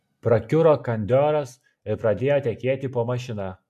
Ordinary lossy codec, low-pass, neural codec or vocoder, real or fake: MP3, 64 kbps; 14.4 kHz; none; real